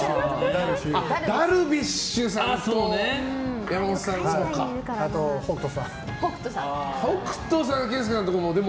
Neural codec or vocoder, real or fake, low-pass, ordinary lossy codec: none; real; none; none